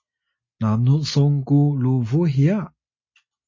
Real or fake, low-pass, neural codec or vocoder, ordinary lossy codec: real; 7.2 kHz; none; MP3, 32 kbps